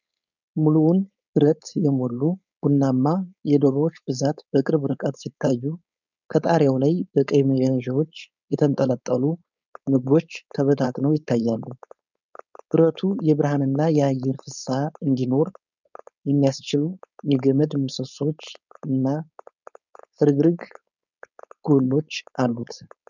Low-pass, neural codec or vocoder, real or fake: 7.2 kHz; codec, 16 kHz, 4.8 kbps, FACodec; fake